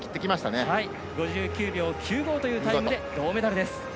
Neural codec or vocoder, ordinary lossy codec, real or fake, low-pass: none; none; real; none